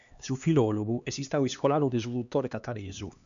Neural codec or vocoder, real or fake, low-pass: codec, 16 kHz, 2 kbps, X-Codec, HuBERT features, trained on LibriSpeech; fake; 7.2 kHz